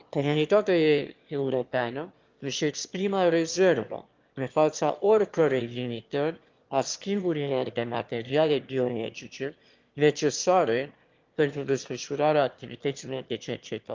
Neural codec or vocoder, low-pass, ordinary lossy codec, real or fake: autoencoder, 22.05 kHz, a latent of 192 numbers a frame, VITS, trained on one speaker; 7.2 kHz; Opus, 32 kbps; fake